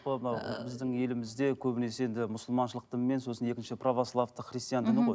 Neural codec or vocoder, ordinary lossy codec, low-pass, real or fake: none; none; none; real